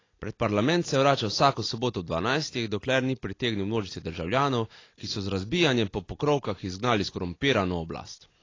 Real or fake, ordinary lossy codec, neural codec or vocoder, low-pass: real; AAC, 32 kbps; none; 7.2 kHz